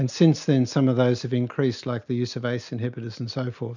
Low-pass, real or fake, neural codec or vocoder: 7.2 kHz; real; none